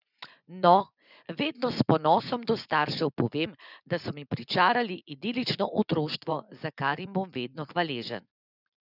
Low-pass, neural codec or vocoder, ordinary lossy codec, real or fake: 5.4 kHz; vocoder, 24 kHz, 100 mel bands, Vocos; none; fake